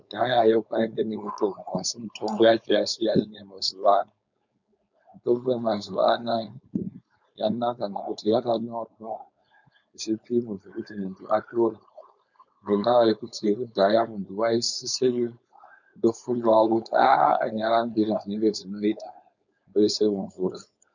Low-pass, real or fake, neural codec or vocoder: 7.2 kHz; fake; codec, 16 kHz, 4.8 kbps, FACodec